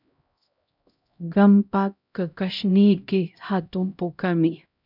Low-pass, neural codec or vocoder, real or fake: 5.4 kHz; codec, 16 kHz, 0.5 kbps, X-Codec, HuBERT features, trained on LibriSpeech; fake